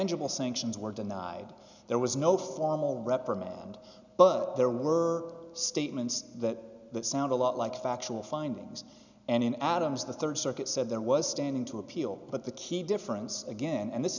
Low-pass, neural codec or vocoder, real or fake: 7.2 kHz; none; real